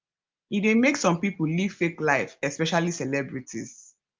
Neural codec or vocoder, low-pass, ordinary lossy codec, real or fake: none; 7.2 kHz; Opus, 32 kbps; real